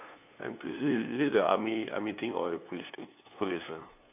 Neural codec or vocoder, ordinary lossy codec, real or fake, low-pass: codec, 16 kHz, 2 kbps, FunCodec, trained on LibriTTS, 25 frames a second; AAC, 32 kbps; fake; 3.6 kHz